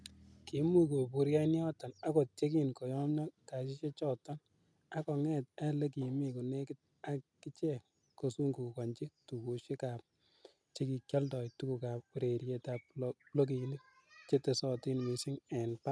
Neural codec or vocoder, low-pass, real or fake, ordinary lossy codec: none; none; real; none